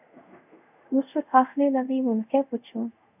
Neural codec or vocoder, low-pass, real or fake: codec, 24 kHz, 0.5 kbps, DualCodec; 3.6 kHz; fake